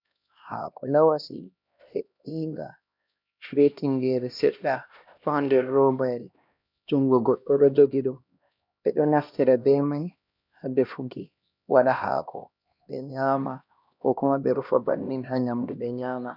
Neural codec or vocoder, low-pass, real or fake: codec, 16 kHz, 1 kbps, X-Codec, HuBERT features, trained on LibriSpeech; 5.4 kHz; fake